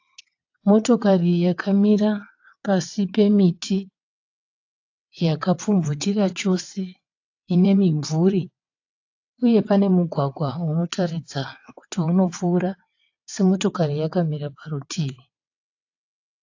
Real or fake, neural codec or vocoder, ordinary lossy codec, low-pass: fake; vocoder, 22.05 kHz, 80 mel bands, WaveNeXt; AAC, 48 kbps; 7.2 kHz